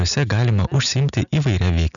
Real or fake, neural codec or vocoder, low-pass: real; none; 7.2 kHz